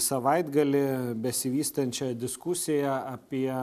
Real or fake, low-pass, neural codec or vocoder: real; 14.4 kHz; none